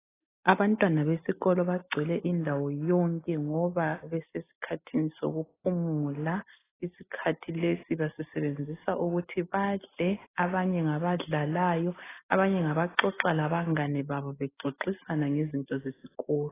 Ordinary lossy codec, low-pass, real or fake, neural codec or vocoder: AAC, 16 kbps; 3.6 kHz; real; none